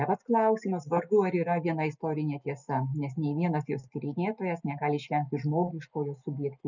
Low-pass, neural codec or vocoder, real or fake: 7.2 kHz; none; real